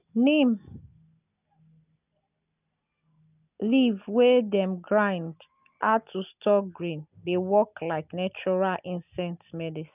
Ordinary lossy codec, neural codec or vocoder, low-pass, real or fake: none; none; 3.6 kHz; real